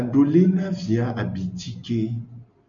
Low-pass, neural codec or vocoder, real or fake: 7.2 kHz; none; real